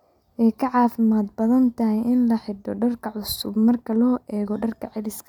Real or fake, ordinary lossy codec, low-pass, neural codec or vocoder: real; none; 19.8 kHz; none